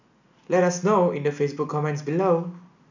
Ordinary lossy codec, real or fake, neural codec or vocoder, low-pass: none; real; none; 7.2 kHz